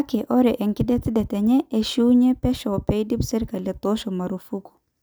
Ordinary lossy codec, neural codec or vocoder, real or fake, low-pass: none; none; real; none